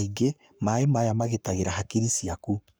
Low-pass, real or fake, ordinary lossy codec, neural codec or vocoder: none; fake; none; codec, 44.1 kHz, 7.8 kbps, Pupu-Codec